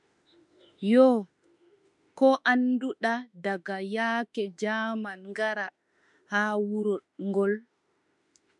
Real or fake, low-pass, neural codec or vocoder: fake; 10.8 kHz; autoencoder, 48 kHz, 32 numbers a frame, DAC-VAE, trained on Japanese speech